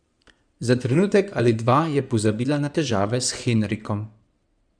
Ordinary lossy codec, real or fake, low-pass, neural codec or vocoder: none; fake; 9.9 kHz; codec, 16 kHz in and 24 kHz out, 2.2 kbps, FireRedTTS-2 codec